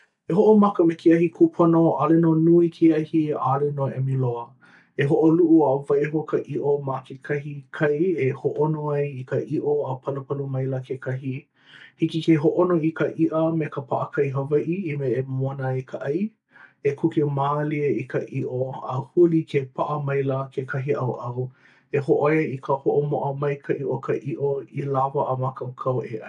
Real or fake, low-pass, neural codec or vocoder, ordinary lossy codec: real; 10.8 kHz; none; MP3, 96 kbps